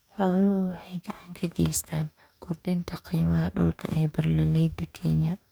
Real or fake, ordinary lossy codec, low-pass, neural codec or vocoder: fake; none; none; codec, 44.1 kHz, 2.6 kbps, DAC